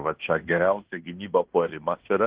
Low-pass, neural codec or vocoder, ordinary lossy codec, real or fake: 3.6 kHz; codec, 16 kHz in and 24 kHz out, 0.9 kbps, LongCat-Audio-Codec, fine tuned four codebook decoder; Opus, 16 kbps; fake